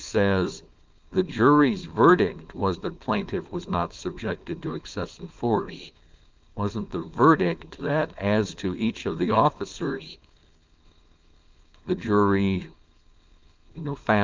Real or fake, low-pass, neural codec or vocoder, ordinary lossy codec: fake; 7.2 kHz; codec, 16 kHz, 4.8 kbps, FACodec; Opus, 24 kbps